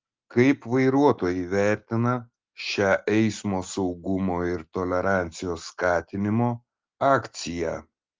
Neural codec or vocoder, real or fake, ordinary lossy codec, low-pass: none; real; Opus, 32 kbps; 7.2 kHz